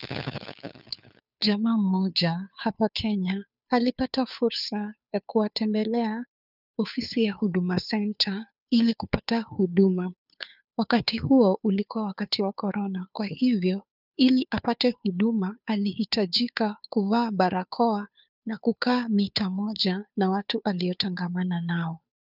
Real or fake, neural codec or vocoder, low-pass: fake; codec, 16 kHz, 2 kbps, FunCodec, trained on Chinese and English, 25 frames a second; 5.4 kHz